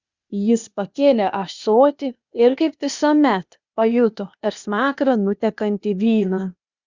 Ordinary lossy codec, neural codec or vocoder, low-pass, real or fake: Opus, 64 kbps; codec, 16 kHz, 0.8 kbps, ZipCodec; 7.2 kHz; fake